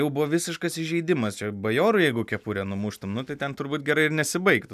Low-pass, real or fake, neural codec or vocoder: 14.4 kHz; real; none